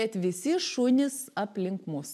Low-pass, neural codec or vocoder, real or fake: 14.4 kHz; none; real